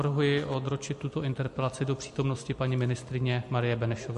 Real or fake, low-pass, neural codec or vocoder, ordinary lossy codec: real; 14.4 kHz; none; MP3, 48 kbps